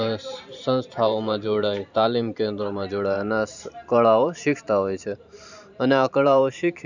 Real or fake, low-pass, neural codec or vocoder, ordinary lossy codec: fake; 7.2 kHz; vocoder, 44.1 kHz, 128 mel bands every 512 samples, BigVGAN v2; none